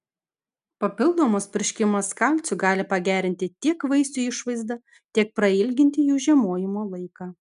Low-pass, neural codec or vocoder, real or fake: 10.8 kHz; none; real